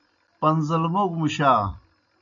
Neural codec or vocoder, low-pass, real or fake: none; 7.2 kHz; real